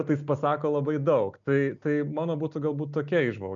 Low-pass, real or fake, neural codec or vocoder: 7.2 kHz; real; none